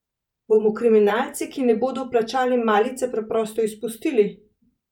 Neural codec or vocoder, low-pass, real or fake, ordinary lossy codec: vocoder, 44.1 kHz, 128 mel bands every 512 samples, BigVGAN v2; 19.8 kHz; fake; none